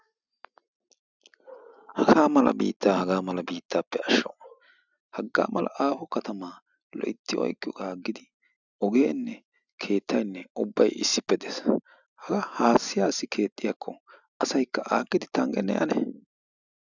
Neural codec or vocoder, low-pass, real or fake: none; 7.2 kHz; real